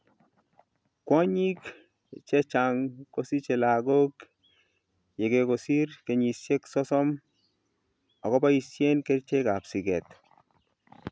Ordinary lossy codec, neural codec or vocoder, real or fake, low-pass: none; none; real; none